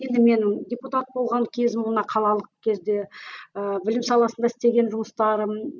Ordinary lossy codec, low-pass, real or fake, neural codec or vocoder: none; 7.2 kHz; real; none